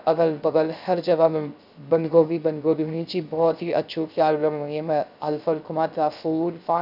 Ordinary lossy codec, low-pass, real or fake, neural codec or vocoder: none; 5.4 kHz; fake; codec, 16 kHz, 0.3 kbps, FocalCodec